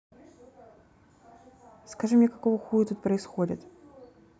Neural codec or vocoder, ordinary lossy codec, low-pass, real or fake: none; none; none; real